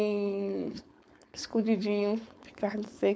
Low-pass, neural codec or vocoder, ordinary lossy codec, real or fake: none; codec, 16 kHz, 4.8 kbps, FACodec; none; fake